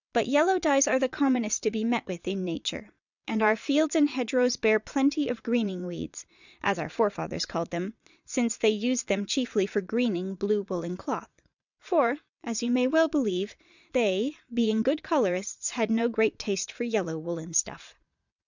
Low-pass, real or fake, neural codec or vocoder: 7.2 kHz; fake; vocoder, 22.05 kHz, 80 mel bands, Vocos